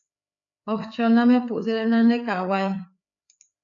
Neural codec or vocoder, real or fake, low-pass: codec, 16 kHz, 4 kbps, FreqCodec, larger model; fake; 7.2 kHz